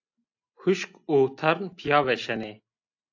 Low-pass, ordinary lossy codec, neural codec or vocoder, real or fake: 7.2 kHz; AAC, 48 kbps; none; real